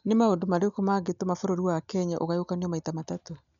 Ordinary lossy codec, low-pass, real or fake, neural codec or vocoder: none; 7.2 kHz; real; none